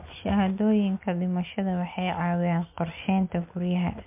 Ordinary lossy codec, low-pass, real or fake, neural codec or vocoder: MP3, 32 kbps; 3.6 kHz; real; none